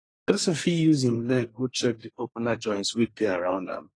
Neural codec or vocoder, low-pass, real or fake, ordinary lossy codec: codec, 24 kHz, 1 kbps, SNAC; 9.9 kHz; fake; AAC, 32 kbps